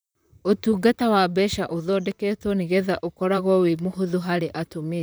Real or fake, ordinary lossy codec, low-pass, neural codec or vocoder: fake; none; none; vocoder, 44.1 kHz, 128 mel bands, Pupu-Vocoder